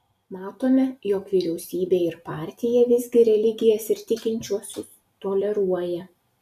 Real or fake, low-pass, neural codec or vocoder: real; 14.4 kHz; none